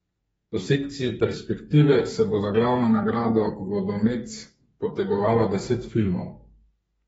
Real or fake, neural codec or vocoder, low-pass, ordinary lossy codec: fake; codec, 32 kHz, 1.9 kbps, SNAC; 14.4 kHz; AAC, 24 kbps